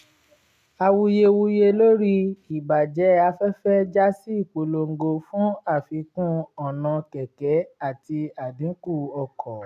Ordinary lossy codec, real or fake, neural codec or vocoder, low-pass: none; real; none; 14.4 kHz